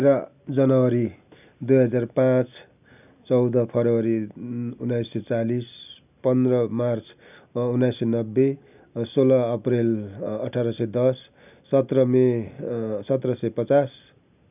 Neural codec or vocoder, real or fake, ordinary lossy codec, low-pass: none; real; none; 3.6 kHz